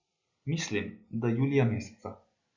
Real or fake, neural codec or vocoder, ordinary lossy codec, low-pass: real; none; Opus, 64 kbps; 7.2 kHz